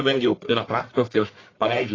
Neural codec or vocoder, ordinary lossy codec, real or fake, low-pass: codec, 44.1 kHz, 1.7 kbps, Pupu-Codec; AAC, 32 kbps; fake; 7.2 kHz